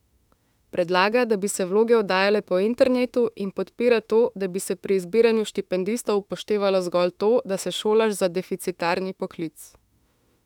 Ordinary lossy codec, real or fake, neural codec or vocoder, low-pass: none; fake; autoencoder, 48 kHz, 32 numbers a frame, DAC-VAE, trained on Japanese speech; 19.8 kHz